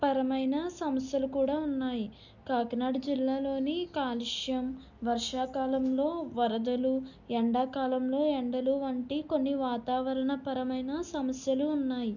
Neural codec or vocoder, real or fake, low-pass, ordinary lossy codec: none; real; 7.2 kHz; none